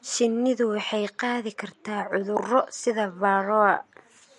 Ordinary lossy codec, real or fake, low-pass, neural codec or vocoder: MP3, 48 kbps; real; 14.4 kHz; none